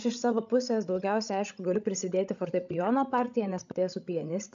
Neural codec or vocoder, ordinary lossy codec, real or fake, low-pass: codec, 16 kHz, 16 kbps, FreqCodec, larger model; AAC, 64 kbps; fake; 7.2 kHz